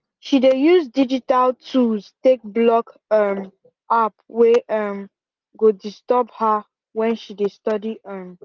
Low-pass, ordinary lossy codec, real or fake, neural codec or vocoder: 7.2 kHz; Opus, 32 kbps; real; none